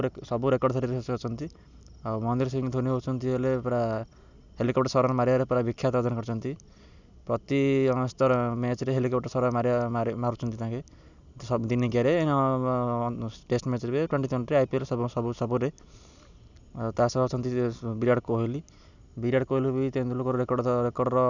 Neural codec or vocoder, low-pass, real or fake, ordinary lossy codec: none; 7.2 kHz; real; none